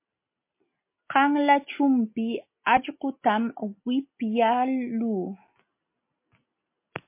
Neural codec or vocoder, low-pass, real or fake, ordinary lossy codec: none; 3.6 kHz; real; MP3, 24 kbps